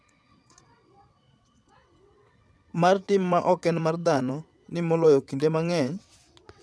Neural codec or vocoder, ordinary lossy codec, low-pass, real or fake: vocoder, 22.05 kHz, 80 mel bands, WaveNeXt; none; none; fake